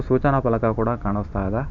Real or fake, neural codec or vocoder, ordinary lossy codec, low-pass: fake; vocoder, 44.1 kHz, 128 mel bands every 512 samples, BigVGAN v2; none; 7.2 kHz